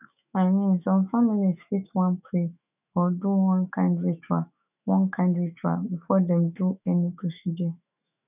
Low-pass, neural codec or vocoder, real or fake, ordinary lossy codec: 3.6 kHz; autoencoder, 48 kHz, 128 numbers a frame, DAC-VAE, trained on Japanese speech; fake; none